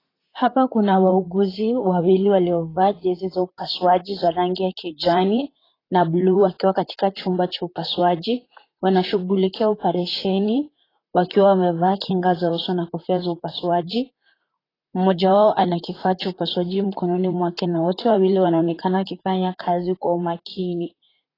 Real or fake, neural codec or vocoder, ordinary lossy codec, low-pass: fake; vocoder, 44.1 kHz, 128 mel bands, Pupu-Vocoder; AAC, 24 kbps; 5.4 kHz